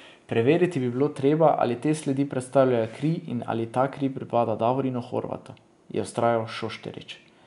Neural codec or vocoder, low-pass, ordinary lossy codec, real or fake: none; 10.8 kHz; none; real